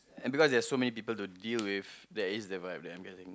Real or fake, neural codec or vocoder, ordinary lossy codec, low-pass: real; none; none; none